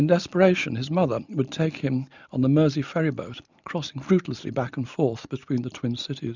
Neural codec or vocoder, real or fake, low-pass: none; real; 7.2 kHz